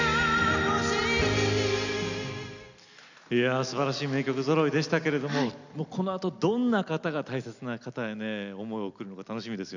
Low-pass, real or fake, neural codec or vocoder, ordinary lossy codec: 7.2 kHz; real; none; none